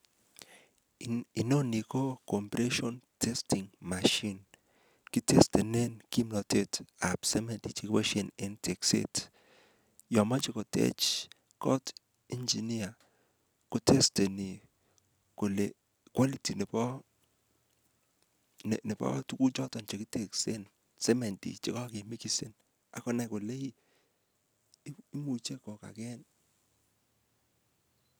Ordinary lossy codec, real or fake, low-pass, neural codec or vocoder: none; real; none; none